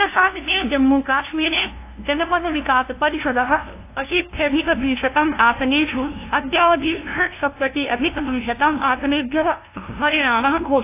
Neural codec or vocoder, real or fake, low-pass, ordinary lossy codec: codec, 16 kHz, 0.5 kbps, FunCodec, trained on LibriTTS, 25 frames a second; fake; 3.6 kHz; AAC, 24 kbps